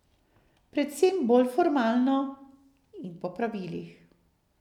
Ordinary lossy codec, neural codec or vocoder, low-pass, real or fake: none; none; 19.8 kHz; real